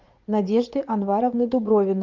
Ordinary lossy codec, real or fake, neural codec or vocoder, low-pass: Opus, 32 kbps; fake; vocoder, 44.1 kHz, 80 mel bands, Vocos; 7.2 kHz